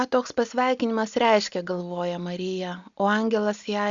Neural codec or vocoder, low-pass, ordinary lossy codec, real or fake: none; 7.2 kHz; Opus, 64 kbps; real